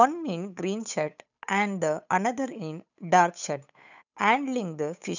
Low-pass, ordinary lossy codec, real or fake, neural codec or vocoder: 7.2 kHz; none; fake; codec, 16 kHz, 8 kbps, FunCodec, trained on Chinese and English, 25 frames a second